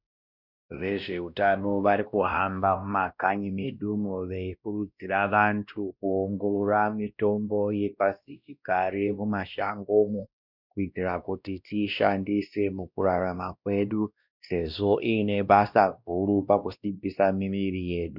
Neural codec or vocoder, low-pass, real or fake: codec, 16 kHz, 1 kbps, X-Codec, WavLM features, trained on Multilingual LibriSpeech; 5.4 kHz; fake